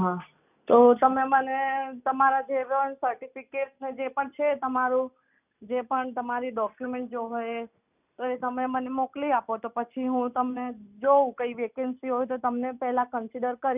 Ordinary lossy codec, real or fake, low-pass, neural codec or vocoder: none; real; 3.6 kHz; none